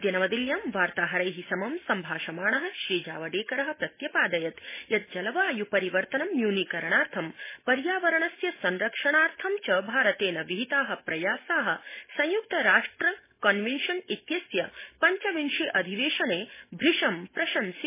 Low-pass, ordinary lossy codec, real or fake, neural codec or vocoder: 3.6 kHz; MP3, 16 kbps; real; none